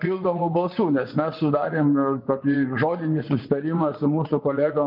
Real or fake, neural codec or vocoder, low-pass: fake; vocoder, 24 kHz, 100 mel bands, Vocos; 5.4 kHz